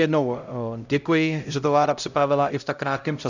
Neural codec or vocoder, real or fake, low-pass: codec, 16 kHz, 0.5 kbps, X-Codec, HuBERT features, trained on LibriSpeech; fake; 7.2 kHz